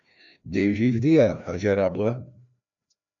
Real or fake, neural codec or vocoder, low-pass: fake; codec, 16 kHz, 1 kbps, FreqCodec, larger model; 7.2 kHz